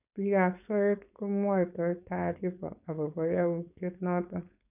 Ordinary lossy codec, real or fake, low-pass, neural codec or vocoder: none; fake; 3.6 kHz; codec, 16 kHz, 4.8 kbps, FACodec